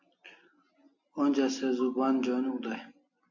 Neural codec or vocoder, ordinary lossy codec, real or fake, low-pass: none; MP3, 48 kbps; real; 7.2 kHz